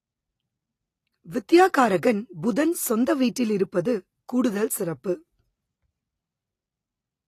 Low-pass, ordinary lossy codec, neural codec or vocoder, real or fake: 14.4 kHz; AAC, 48 kbps; none; real